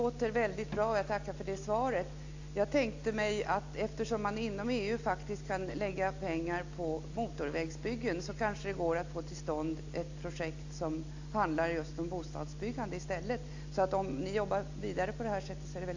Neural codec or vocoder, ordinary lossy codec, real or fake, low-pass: none; AAC, 48 kbps; real; 7.2 kHz